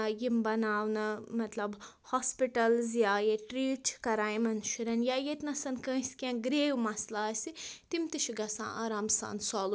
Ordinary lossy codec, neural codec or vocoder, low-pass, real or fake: none; none; none; real